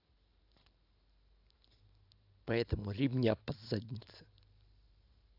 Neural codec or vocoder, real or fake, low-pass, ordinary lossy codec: vocoder, 44.1 kHz, 128 mel bands every 512 samples, BigVGAN v2; fake; 5.4 kHz; AAC, 48 kbps